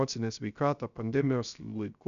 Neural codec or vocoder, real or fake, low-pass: codec, 16 kHz, 0.3 kbps, FocalCodec; fake; 7.2 kHz